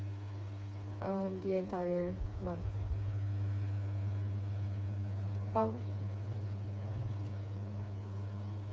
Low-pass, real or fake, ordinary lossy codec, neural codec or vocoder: none; fake; none; codec, 16 kHz, 4 kbps, FreqCodec, smaller model